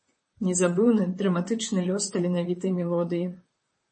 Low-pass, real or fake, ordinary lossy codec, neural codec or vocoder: 9.9 kHz; fake; MP3, 32 kbps; vocoder, 44.1 kHz, 128 mel bands, Pupu-Vocoder